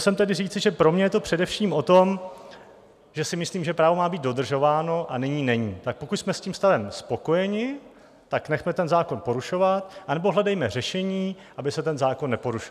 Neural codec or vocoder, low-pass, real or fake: none; 14.4 kHz; real